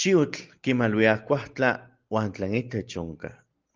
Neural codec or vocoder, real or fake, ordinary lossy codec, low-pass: none; real; Opus, 32 kbps; 7.2 kHz